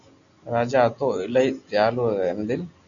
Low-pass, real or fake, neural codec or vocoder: 7.2 kHz; real; none